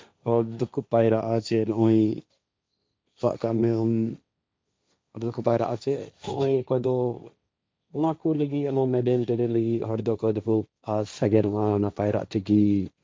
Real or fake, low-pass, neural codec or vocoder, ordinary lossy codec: fake; none; codec, 16 kHz, 1.1 kbps, Voila-Tokenizer; none